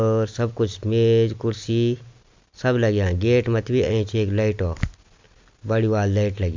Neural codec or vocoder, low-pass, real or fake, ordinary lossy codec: none; 7.2 kHz; real; MP3, 64 kbps